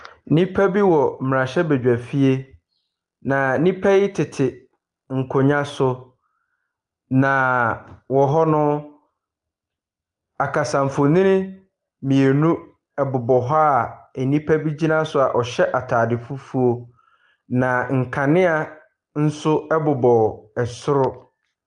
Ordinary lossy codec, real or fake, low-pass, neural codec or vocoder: Opus, 32 kbps; real; 10.8 kHz; none